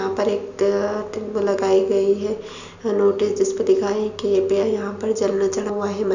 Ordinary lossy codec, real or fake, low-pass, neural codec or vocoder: none; real; 7.2 kHz; none